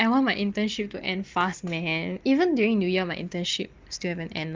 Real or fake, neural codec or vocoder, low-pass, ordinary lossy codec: real; none; 7.2 kHz; Opus, 32 kbps